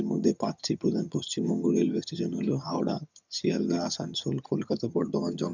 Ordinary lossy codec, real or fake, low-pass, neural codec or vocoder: none; fake; 7.2 kHz; vocoder, 22.05 kHz, 80 mel bands, HiFi-GAN